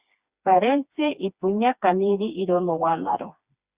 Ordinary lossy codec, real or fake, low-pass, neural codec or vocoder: Opus, 64 kbps; fake; 3.6 kHz; codec, 16 kHz, 2 kbps, FreqCodec, smaller model